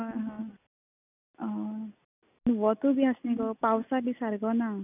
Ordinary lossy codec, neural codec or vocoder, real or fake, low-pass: none; none; real; 3.6 kHz